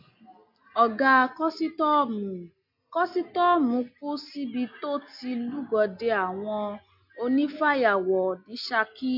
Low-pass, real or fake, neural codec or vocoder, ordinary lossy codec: 5.4 kHz; real; none; MP3, 48 kbps